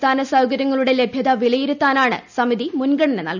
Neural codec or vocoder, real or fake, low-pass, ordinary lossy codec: none; real; 7.2 kHz; none